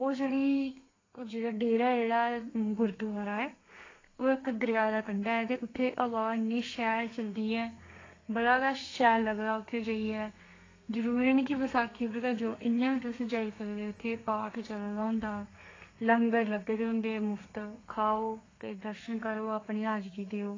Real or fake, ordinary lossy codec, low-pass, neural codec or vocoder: fake; AAC, 32 kbps; 7.2 kHz; codec, 32 kHz, 1.9 kbps, SNAC